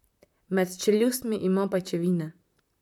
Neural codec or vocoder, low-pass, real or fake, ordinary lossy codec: vocoder, 44.1 kHz, 128 mel bands, Pupu-Vocoder; 19.8 kHz; fake; none